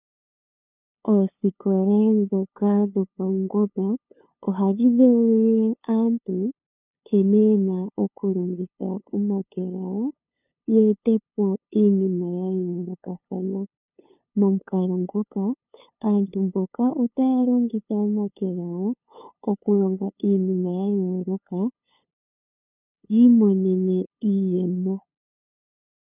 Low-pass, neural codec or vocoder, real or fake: 3.6 kHz; codec, 16 kHz, 2 kbps, FunCodec, trained on LibriTTS, 25 frames a second; fake